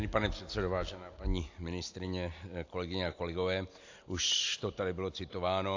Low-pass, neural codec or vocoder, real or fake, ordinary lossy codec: 7.2 kHz; none; real; AAC, 48 kbps